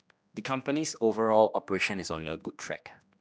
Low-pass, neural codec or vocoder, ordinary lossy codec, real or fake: none; codec, 16 kHz, 1 kbps, X-Codec, HuBERT features, trained on general audio; none; fake